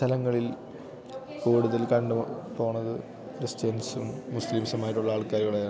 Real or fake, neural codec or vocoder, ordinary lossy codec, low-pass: real; none; none; none